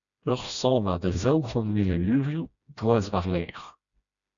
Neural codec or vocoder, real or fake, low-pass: codec, 16 kHz, 1 kbps, FreqCodec, smaller model; fake; 7.2 kHz